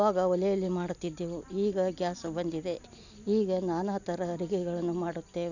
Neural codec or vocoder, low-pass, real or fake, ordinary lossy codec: none; 7.2 kHz; real; none